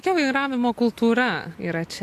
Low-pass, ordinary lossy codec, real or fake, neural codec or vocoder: 14.4 kHz; AAC, 64 kbps; real; none